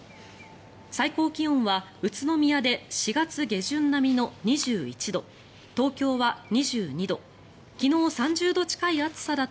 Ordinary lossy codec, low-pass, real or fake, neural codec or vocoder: none; none; real; none